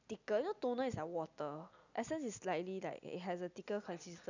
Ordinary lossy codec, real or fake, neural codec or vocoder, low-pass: none; real; none; 7.2 kHz